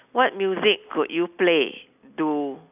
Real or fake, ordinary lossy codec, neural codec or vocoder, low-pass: real; none; none; 3.6 kHz